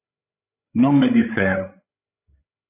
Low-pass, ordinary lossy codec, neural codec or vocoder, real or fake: 3.6 kHz; AAC, 24 kbps; codec, 16 kHz, 16 kbps, FreqCodec, larger model; fake